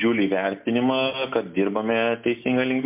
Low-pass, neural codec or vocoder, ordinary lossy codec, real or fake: 3.6 kHz; none; MP3, 32 kbps; real